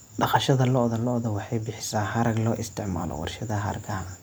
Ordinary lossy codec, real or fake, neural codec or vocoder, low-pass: none; real; none; none